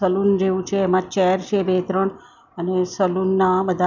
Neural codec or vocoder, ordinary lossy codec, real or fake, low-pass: none; none; real; 7.2 kHz